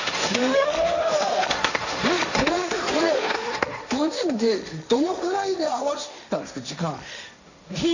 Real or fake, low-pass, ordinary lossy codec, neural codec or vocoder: fake; 7.2 kHz; none; codec, 16 kHz, 1.1 kbps, Voila-Tokenizer